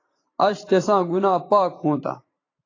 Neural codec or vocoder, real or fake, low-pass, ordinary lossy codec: vocoder, 44.1 kHz, 128 mel bands every 512 samples, BigVGAN v2; fake; 7.2 kHz; AAC, 32 kbps